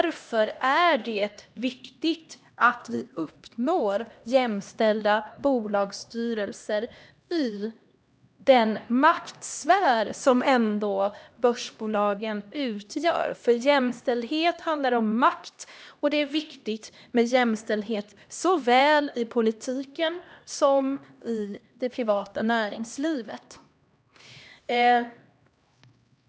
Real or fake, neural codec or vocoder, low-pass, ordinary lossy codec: fake; codec, 16 kHz, 1 kbps, X-Codec, HuBERT features, trained on LibriSpeech; none; none